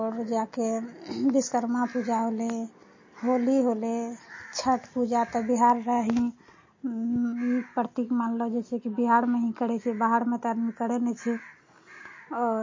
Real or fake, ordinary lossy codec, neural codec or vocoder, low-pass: real; MP3, 32 kbps; none; 7.2 kHz